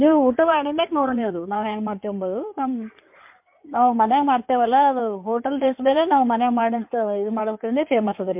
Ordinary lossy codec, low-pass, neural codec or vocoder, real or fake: MP3, 32 kbps; 3.6 kHz; codec, 16 kHz in and 24 kHz out, 2.2 kbps, FireRedTTS-2 codec; fake